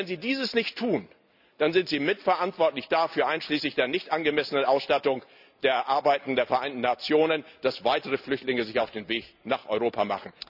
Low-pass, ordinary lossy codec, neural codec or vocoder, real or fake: 5.4 kHz; none; none; real